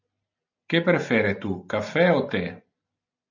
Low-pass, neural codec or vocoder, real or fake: 7.2 kHz; none; real